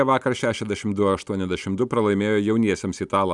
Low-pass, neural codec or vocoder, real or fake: 10.8 kHz; none; real